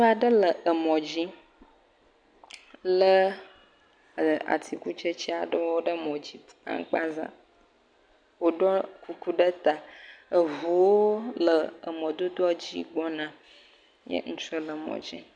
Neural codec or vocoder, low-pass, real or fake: none; 9.9 kHz; real